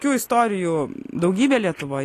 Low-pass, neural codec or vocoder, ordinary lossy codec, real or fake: 14.4 kHz; none; AAC, 48 kbps; real